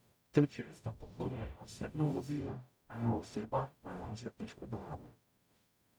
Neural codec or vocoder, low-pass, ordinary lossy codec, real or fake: codec, 44.1 kHz, 0.9 kbps, DAC; none; none; fake